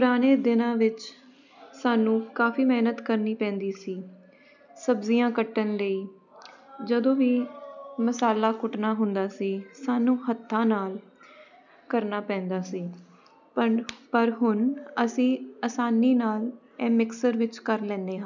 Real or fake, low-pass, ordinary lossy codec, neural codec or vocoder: real; 7.2 kHz; none; none